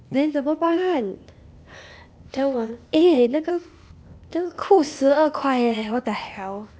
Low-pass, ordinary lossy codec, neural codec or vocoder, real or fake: none; none; codec, 16 kHz, 0.8 kbps, ZipCodec; fake